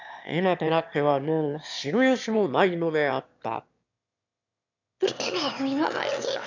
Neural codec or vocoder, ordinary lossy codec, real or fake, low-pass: autoencoder, 22.05 kHz, a latent of 192 numbers a frame, VITS, trained on one speaker; none; fake; 7.2 kHz